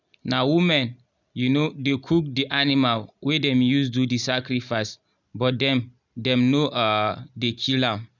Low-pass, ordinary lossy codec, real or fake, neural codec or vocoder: 7.2 kHz; none; real; none